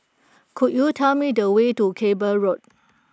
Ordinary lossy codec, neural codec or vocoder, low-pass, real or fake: none; none; none; real